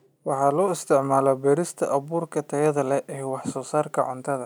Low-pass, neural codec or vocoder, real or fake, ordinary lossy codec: none; none; real; none